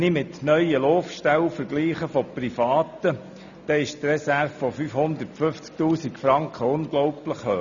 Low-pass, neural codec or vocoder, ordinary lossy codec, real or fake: 7.2 kHz; none; none; real